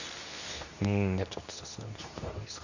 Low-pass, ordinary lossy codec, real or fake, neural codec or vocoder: 7.2 kHz; none; fake; codec, 24 kHz, 0.9 kbps, WavTokenizer, medium speech release version 2